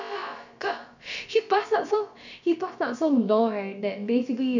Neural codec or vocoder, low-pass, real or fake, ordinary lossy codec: codec, 16 kHz, about 1 kbps, DyCAST, with the encoder's durations; 7.2 kHz; fake; none